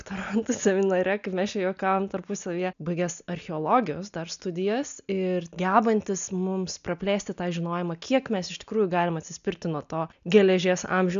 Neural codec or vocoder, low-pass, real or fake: none; 7.2 kHz; real